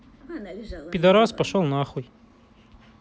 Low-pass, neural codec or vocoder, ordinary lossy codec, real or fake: none; none; none; real